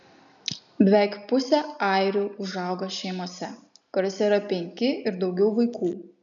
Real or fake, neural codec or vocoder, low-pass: real; none; 7.2 kHz